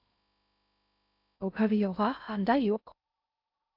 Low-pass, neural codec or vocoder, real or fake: 5.4 kHz; codec, 16 kHz in and 24 kHz out, 0.6 kbps, FocalCodec, streaming, 2048 codes; fake